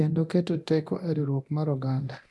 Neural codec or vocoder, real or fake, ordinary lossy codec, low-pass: codec, 24 kHz, 0.9 kbps, DualCodec; fake; none; none